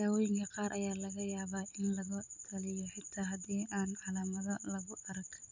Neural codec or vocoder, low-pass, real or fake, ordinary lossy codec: vocoder, 44.1 kHz, 128 mel bands every 256 samples, BigVGAN v2; 7.2 kHz; fake; none